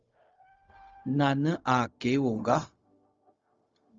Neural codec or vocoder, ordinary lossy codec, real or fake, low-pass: codec, 16 kHz, 0.4 kbps, LongCat-Audio-Codec; Opus, 32 kbps; fake; 7.2 kHz